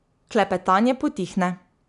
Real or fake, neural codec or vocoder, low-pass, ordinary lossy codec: real; none; 10.8 kHz; none